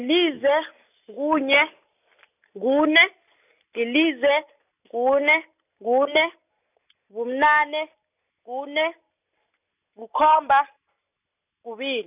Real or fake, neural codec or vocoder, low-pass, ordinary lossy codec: real; none; 3.6 kHz; none